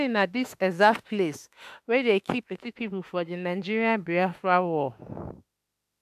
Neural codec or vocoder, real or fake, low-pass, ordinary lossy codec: autoencoder, 48 kHz, 32 numbers a frame, DAC-VAE, trained on Japanese speech; fake; 14.4 kHz; AAC, 96 kbps